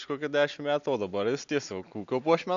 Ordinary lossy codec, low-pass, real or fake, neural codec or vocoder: AAC, 64 kbps; 7.2 kHz; real; none